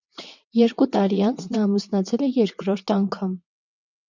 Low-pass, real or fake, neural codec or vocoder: 7.2 kHz; fake; vocoder, 22.05 kHz, 80 mel bands, WaveNeXt